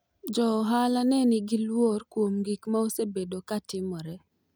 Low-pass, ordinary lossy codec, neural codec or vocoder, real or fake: none; none; none; real